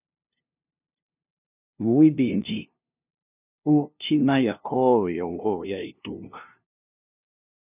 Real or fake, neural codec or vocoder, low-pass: fake; codec, 16 kHz, 0.5 kbps, FunCodec, trained on LibriTTS, 25 frames a second; 3.6 kHz